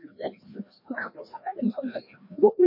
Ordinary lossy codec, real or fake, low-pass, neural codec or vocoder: MP3, 32 kbps; fake; 5.4 kHz; codec, 16 kHz, 1 kbps, FreqCodec, larger model